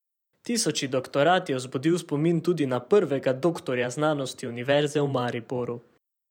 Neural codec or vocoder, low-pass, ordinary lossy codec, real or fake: vocoder, 44.1 kHz, 128 mel bands every 512 samples, BigVGAN v2; 19.8 kHz; none; fake